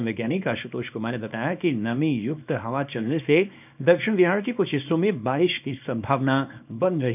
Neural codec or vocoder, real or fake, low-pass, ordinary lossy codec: codec, 24 kHz, 0.9 kbps, WavTokenizer, small release; fake; 3.6 kHz; none